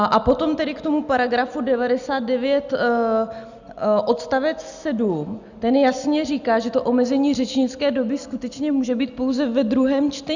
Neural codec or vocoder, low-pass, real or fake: none; 7.2 kHz; real